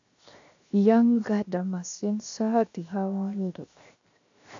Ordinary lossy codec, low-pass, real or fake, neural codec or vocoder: AAC, 64 kbps; 7.2 kHz; fake; codec, 16 kHz, 0.7 kbps, FocalCodec